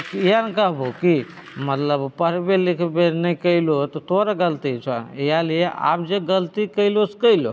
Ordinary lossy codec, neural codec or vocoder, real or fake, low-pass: none; none; real; none